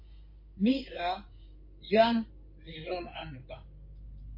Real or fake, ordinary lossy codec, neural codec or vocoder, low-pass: fake; MP3, 32 kbps; codec, 16 kHz in and 24 kHz out, 2.2 kbps, FireRedTTS-2 codec; 5.4 kHz